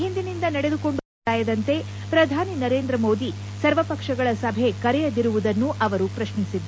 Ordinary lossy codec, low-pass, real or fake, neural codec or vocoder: none; none; real; none